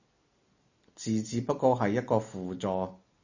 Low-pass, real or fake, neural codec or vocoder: 7.2 kHz; real; none